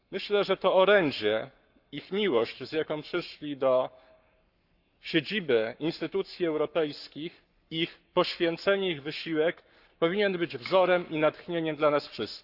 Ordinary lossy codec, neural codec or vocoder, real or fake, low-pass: Opus, 64 kbps; codec, 44.1 kHz, 7.8 kbps, Pupu-Codec; fake; 5.4 kHz